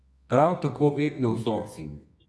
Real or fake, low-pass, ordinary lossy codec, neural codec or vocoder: fake; none; none; codec, 24 kHz, 0.9 kbps, WavTokenizer, medium music audio release